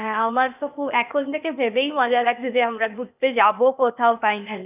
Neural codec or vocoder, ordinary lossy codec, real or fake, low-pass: codec, 16 kHz, 0.8 kbps, ZipCodec; none; fake; 3.6 kHz